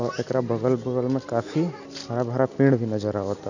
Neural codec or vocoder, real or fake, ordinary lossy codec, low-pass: none; real; none; 7.2 kHz